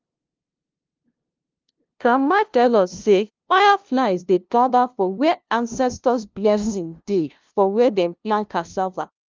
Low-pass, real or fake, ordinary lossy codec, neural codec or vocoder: 7.2 kHz; fake; Opus, 24 kbps; codec, 16 kHz, 0.5 kbps, FunCodec, trained on LibriTTS, 25 frames a second